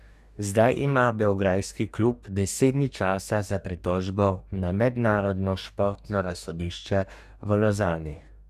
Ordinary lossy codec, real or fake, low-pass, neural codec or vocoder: none; fake; 14.4 kHz; codec, 44.1 kHz, 2.6 kbps, DAC